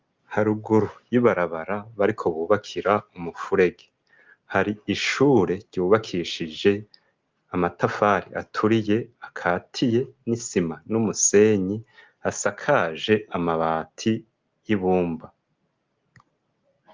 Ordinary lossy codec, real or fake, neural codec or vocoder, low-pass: Opus, 32 kbps; real; none; 7.2 kHz